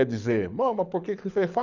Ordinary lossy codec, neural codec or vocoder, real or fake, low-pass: none; codec, 24 kHz, 6 kbps, HILCodec; fake; 7.2 kHz